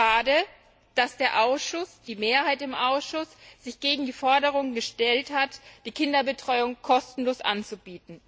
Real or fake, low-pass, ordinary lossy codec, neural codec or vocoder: real; none; none; none